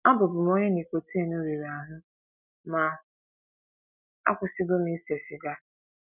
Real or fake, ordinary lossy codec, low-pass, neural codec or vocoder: real; none; 3.6 kHz; none